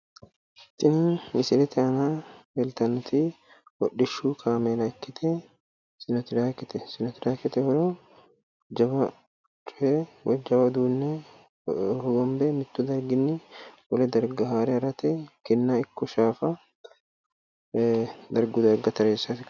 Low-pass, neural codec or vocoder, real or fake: 7.2 kHz; none; real